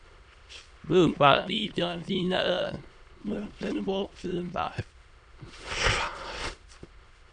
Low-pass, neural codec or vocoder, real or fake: 9.9 kHz; autoencoder, 22.05 kHz, a latent of 192 numbers a frame, VITS, trained on many speakers; fake